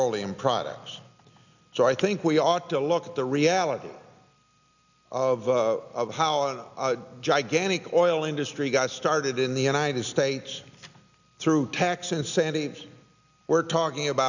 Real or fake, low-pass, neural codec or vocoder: real; 7.2 kHz; none